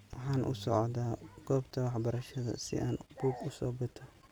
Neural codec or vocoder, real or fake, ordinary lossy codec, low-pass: none; real; none; none